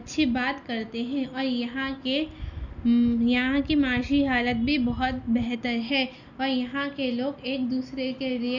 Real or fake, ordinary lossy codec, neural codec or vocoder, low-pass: real; none; none; 7.2 kHz